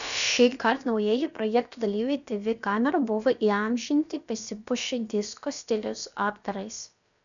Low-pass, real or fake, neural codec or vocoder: 7.2 kHz; fake; codec, 16 kHz, about 1 kbps, DyCAST, with the encoder's durations